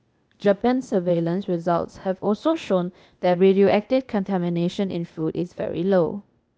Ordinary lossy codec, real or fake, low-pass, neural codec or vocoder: none; fake; none; codec, 16 kHz, 0.8 kbps, ZipCodec